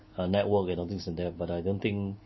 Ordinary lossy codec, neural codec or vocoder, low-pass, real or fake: MP3, 24 kbps; none; 7.2 kHz; real